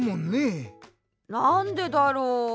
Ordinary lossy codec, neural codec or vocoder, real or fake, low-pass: none; none; real; none